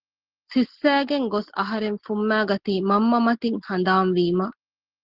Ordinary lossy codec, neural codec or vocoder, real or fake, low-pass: Opus, 16 kbps; none; real; 5.4 kHz